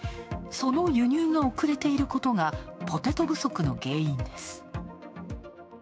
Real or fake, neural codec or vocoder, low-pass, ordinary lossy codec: fake; codec, 16 kHz, 6 kbps, DAC; none; none